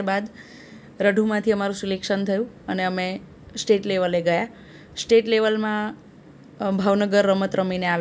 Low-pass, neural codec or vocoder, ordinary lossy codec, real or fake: none; none; none; real